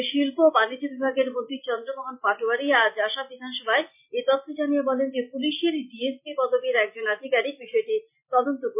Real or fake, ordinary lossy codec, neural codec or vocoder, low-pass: real; MP3, 32 kbps; none; 3.6 kHz